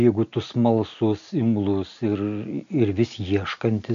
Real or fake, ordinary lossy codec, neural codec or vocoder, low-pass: real; AAC, 96 kbps; none; 7.2 kHz